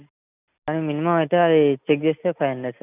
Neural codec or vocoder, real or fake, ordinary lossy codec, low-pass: none; real; none; 3.6 kHz